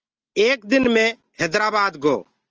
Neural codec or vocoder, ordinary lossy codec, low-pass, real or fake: none; Opus, 24 kbps; 7.2 kHz; real